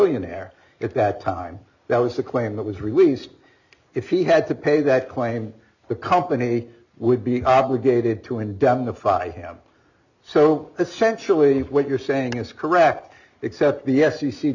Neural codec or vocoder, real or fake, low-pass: none; real; 7.2 kHz